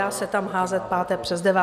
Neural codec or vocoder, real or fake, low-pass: none; real; 14.4 kHz